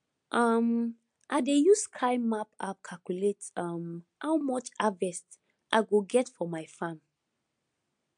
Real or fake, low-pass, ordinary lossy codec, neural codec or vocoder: real; 9.9 kHz; MP3, 64 kbps; none